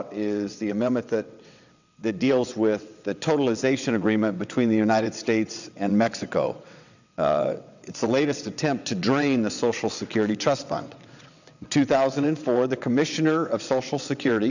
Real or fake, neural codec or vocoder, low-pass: fake; vocoder, 22.05 kHz, 80 mel bands, WaveNeXt; 7.2 kHz